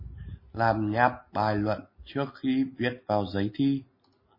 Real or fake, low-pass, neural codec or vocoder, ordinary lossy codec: real; 5.4 kHz; none; MP3, 24 kbps